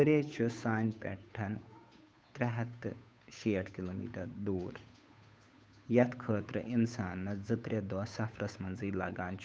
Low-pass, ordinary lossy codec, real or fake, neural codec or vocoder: none; none; fake; codec, 16 kHz, 8 kbps, FunCodec, trained on Chinese and English, 25 frames a second